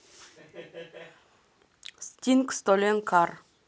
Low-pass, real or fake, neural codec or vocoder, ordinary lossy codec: none; real; none; none